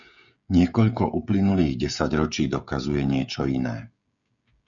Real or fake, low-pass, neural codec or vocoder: fake; 7.2 kHz; codec, 16 kHz, 16 kbps, FreqCodec, smaller model